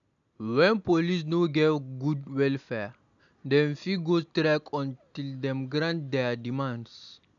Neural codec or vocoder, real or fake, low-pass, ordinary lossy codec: none; real; 7.2 kHz; none